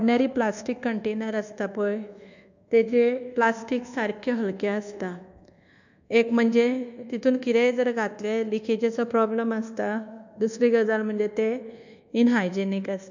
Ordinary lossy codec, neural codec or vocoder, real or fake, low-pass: none; codec, 24 kHz, 1.2 kbps, DualCodec; fake; 7.2 kHz